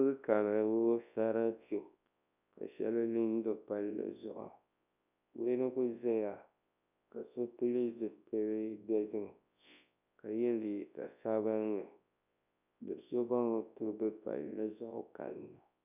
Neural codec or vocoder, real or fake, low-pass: codec, 24 kHz, 0.9 kbps, WavTokenizer, large speech release; fake; 3.6 kHz